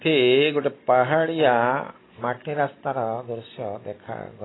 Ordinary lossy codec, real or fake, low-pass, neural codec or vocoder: AAC, 16 kbps; real; 7.2 kHz; none